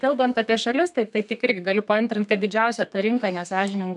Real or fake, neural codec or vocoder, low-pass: fake; codec, 32 kHz, 1.9 kbps, SNAC; 10.8 kHz